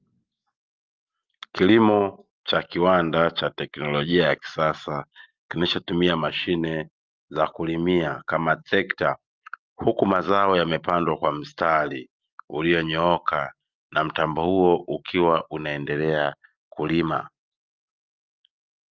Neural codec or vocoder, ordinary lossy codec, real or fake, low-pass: none; Opus, 32 kbps; real; 7.2 kHz